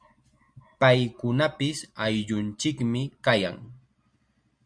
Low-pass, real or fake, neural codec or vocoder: 9.9 kHz; real; none